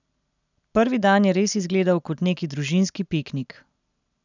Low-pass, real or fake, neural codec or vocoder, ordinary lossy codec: 7.2 kHz; real; none; none